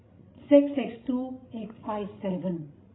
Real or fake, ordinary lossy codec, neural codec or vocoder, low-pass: fake; AAC, 16 kbps; codec, 16 kHz, 16 kbps, FreqCodec, larger model; 7.2 kHz